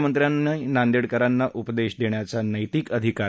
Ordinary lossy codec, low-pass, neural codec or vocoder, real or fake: none; none; none; real